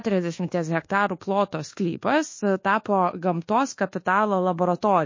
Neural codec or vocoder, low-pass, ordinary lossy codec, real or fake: codec, 24 kHz, 1.2 kbps, DualCodec; 7.2 kHz; MP3, 32 kbps; fake